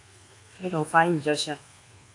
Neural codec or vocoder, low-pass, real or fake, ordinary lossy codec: codec, 24 kHz, 1.2 kbps, DualCodec; 10.8 kHz; fake; AAC, 48 kbps